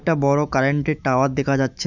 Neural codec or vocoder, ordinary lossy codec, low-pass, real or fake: autoencoder, 48 kHz, 128 numbers a frame, DAC-VAE, trained on Japanese speech; none; 7.2 kHz; fake